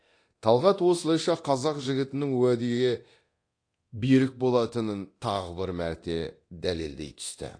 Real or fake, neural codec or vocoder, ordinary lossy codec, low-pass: fake; codec, 24 kHz, 0.9 kbps, DualCodec; AAC, 48 kbps; 9.9 kHz